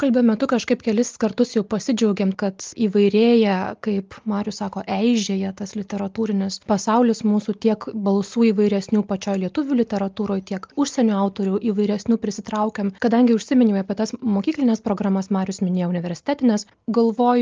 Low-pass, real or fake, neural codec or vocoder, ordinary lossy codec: 7.2 kHz; real; none; Opus, 24 kbps